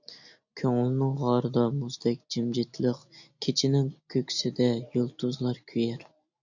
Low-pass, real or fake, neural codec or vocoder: 7.2 kHz; real; none